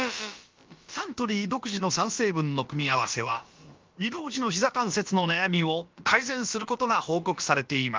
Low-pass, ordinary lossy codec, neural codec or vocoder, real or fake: 7.2 kHz; Opus, 32 kbps; codec, 16 kHz, about 1 kbps, DyCAST, with the encoder's durations; fake